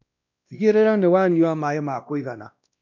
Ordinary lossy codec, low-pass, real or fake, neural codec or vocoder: none; 7.2 kHz; fake; codec, 16 kHz, 1 kbps, X-Codec, WavLM features, trained on Multilingual LibriSpeech